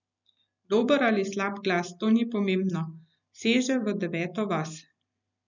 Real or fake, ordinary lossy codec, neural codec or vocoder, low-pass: real; none; none; 7.2 kHz